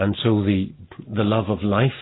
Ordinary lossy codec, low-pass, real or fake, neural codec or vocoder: AAC, 16 kbps; 7.2 kHz; real; none